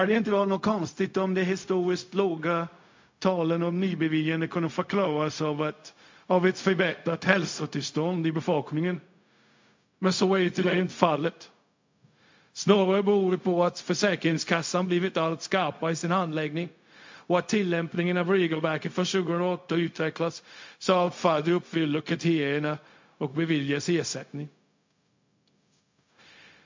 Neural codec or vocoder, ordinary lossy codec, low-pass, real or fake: codec, 16 kHz, 0.4 kbps, LongCat-Audio-Codec; MP3, 48 kbps; 7.2 kHz; fake